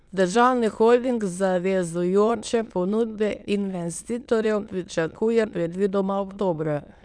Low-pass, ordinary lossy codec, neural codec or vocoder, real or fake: 9.9 kHz; none; autoencoder, 22.05 kHz, a latent of 192 numbers a frame, VITS, trained on many speakers; fake